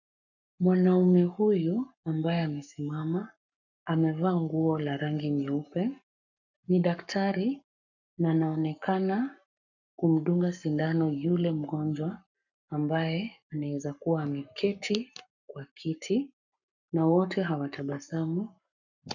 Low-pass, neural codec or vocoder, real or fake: 7.2 kHz; codec, 44.1 kHz, 7.8 kbps, Pupu-Codec; fake